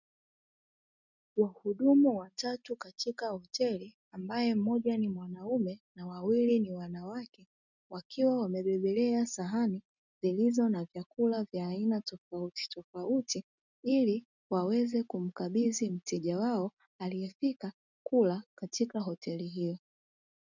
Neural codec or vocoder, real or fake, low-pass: none; real; 7.2 kHz